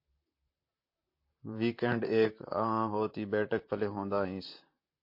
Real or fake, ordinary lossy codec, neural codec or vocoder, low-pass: fake; MP3, 32 kbps; vocoder, 44.1 kHz, 128 mel bands, Pupu-Vocoder; 5.4 kHz